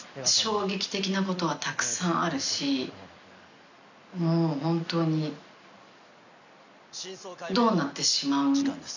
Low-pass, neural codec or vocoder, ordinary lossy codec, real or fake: 7.2 kHz; none; none; real